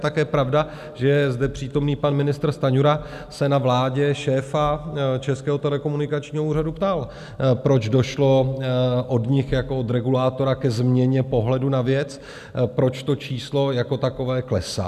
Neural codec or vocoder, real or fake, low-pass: none; real; 14.4 kHz